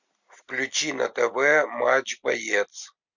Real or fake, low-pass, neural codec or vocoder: real; 7.2 kHz; none